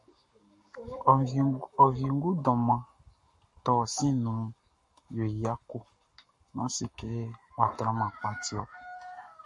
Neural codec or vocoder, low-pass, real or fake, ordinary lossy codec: codec, 44.1 kHz, 7.8 kbps, Pupu-Codec; 10.8 kHz; fake; MP3, 48 kbps